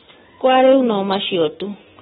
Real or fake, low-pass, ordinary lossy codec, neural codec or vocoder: real; 19.8 kHz; AAC, 16 kbps; none